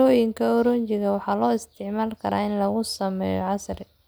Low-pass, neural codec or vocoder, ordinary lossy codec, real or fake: none; none; none; real